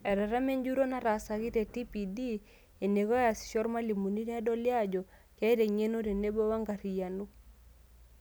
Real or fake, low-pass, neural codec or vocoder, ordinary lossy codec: real; none; none; none